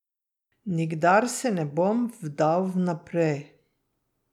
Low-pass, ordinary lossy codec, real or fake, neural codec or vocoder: 19.8 kHz; none; real; none